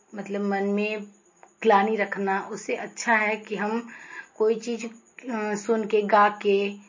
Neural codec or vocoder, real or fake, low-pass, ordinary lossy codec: none; real; 7.2 kHz; MP3, 32 kbps